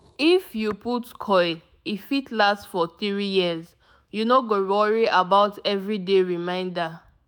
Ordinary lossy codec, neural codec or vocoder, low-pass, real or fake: none; autoencoder, 48 kHz, 128 numbers a frame, DAC-VAE, trained on Japanese speech; none; fake